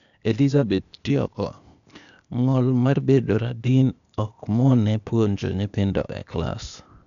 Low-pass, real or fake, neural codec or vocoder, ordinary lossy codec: 7.2 kHz; fake; codec, 16 kHz, 0.8 kbps, ZipCodec; none